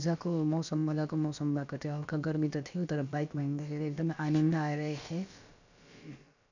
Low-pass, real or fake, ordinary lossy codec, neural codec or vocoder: 7.2 kHz; fake; none; codec, 16 kHz, about 1 kbps, DyCAST, with the encoder's durations